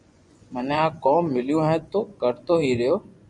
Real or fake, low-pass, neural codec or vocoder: fake; 10.8 kHz; vocoder, 24 kHz, 100 mel bands, Vocos